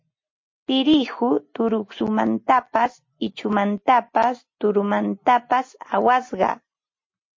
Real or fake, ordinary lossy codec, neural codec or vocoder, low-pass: real; MP3, 32 kbps; none; 7.2 kHz